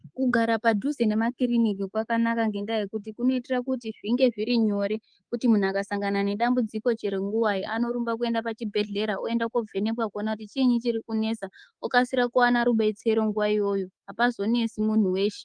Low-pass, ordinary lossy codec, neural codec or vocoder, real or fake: 9.9 kHz; Opus, 24 kbps; codec, 24 kHz, 3.1 kbps, DualCodec; fake